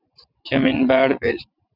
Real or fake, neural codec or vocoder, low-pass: fake; vocoder, 22.05 kHz, 80 mel bands, WaveNeXt; 5.4 kHz